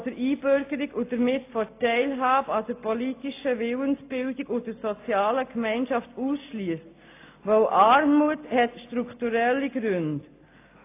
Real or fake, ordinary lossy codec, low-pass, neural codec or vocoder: real; AAC, 24 kbps; 3.6 kHz; none